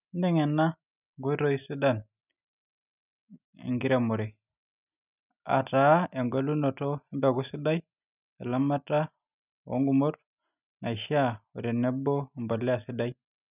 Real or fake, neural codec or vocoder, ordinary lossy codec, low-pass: real; none; none; 3.6 kHz